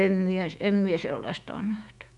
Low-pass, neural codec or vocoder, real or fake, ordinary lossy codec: 10.8 kHz; autoencoder, 48 kHz, 128 numbers a frame, DAC-VAE, trained on Japanese speech; fake; none